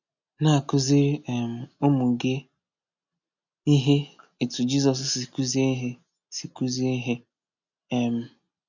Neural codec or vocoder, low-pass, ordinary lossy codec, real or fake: none; 7.2 kHz; none; real